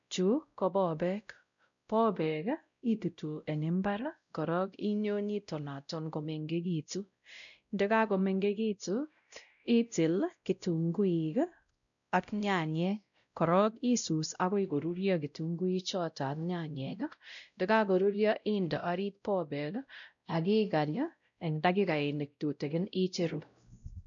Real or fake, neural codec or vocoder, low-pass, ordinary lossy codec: fake; codec, 16 kHz, 0.5 kbps, X-Codec, WavLM features, trained on Multilingual LibriSpeech; 7.2 kHz; MP3, 96 kbps